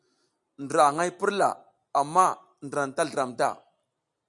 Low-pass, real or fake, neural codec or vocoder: 10.8 kHz; real; none